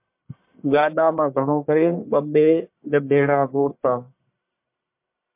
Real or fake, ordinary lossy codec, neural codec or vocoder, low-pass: fake; MP3, 32 kbps; codec, 44.1 kHz, 1.7 kbps, Pupu-Codec; 3.6 kHz